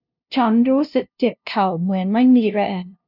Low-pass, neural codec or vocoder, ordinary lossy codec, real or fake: 5.4 kHz; codec, 16 kHz, 0.5 kbps, FunCodec, trained on LibriTTS, 25 frames a second; none; fake